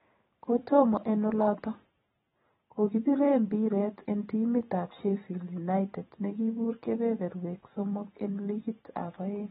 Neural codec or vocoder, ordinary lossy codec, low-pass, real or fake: vocoder, 44.1 kHz, 128 mel bands every 512 samples, BigVGAN v2; AAC, 16 kbps; 19.8 kHz; fake